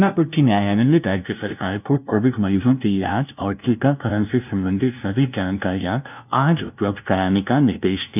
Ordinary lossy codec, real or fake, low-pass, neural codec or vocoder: none; fake; 3.6 kHz; codec, 16 kHz, 0.5 kbps, FunCodec, trained on LibriTTS, 25 frames a second